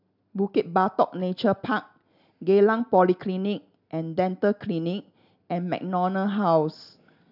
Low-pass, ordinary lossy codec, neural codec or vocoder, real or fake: 5.4 kHz; none; none; real